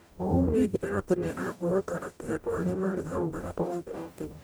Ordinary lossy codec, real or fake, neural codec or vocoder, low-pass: none; fake; codec, 44.1 kHz, 0.9 kbps, DAC; none